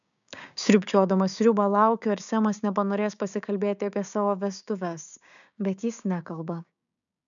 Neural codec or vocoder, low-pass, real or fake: codec, 16 kHz, 6 kbps, DAC; 7.2 kHz; fake